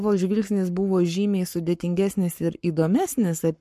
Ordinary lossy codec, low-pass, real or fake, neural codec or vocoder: MP3, 64 kbps; 14.4 kHz; fake; codec, 44.1 kHz, 7.8 kbps, Pupu-Codec